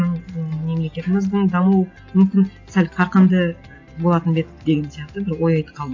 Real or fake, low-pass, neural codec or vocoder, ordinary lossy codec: real; 7.2 kHz; none; AAC, 48 kbps